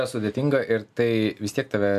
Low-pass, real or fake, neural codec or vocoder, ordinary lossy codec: 14.4 kHz; real; none; AAC, 96 kbps